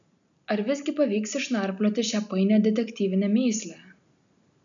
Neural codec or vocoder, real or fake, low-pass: none; real; 7.2 kHz